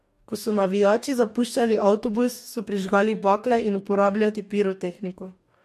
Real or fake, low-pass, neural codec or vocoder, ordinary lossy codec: fake; 14.4 kHz; codec, 44.1 kHz, 2.6 kbps, DAC; MP3, 64 kbps